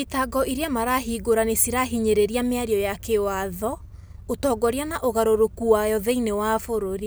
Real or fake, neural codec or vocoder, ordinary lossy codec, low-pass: real; none; none; none